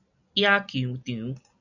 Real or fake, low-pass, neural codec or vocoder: real; 7.2 kHz; none